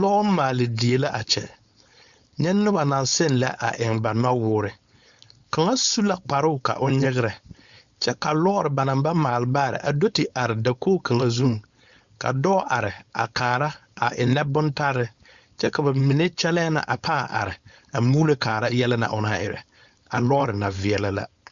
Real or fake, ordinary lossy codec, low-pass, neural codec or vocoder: fake; Opus, 64 kbps; 7.2 kHz; codec, 16 kHz, 4.8 kbps, FACodec